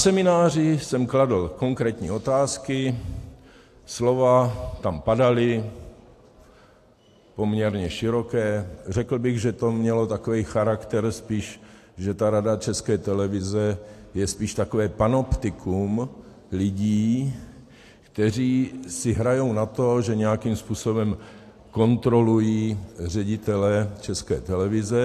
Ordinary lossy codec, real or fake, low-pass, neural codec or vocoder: AAC, 64 kbps; real; 14.4 kHz; none